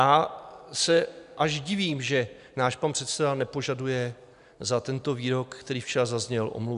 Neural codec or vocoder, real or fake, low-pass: none; real; 10.8 kHz